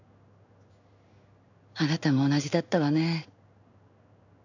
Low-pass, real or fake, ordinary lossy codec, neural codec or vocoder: 7.2 kHz; fake; none; codec, 16 kHz in and 24 kHz out, 1 kbps, XY-Tokenizer